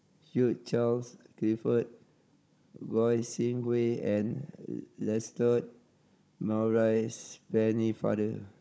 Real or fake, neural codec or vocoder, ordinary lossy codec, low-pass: fake; codec, 16 kHz, 16 kbps, FunCodec, trained on Chinese and English, 50 frames a second; none; none